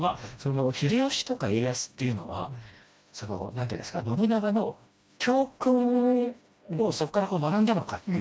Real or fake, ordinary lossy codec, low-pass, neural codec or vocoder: fake; none; none; codec, 16 kHz, 1 kbps, FreqCodec, smaller model